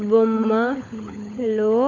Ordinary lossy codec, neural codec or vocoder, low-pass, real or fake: none; codec, 16 kHz, 16 kbps, FunCodec, trained on LibriTTS, 50 frames a second; 7.2 kHz; fake